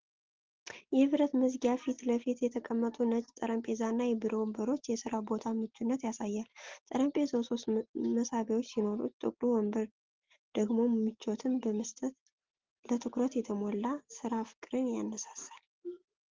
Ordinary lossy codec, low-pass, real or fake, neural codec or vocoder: Opus, 16 kbps; 7.2 kHz; real; none